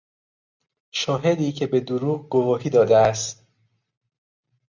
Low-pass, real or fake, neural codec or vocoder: 7.2 kHz; real; none